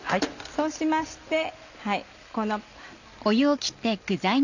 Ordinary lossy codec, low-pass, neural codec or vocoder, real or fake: none; 7.2 kHz; none; real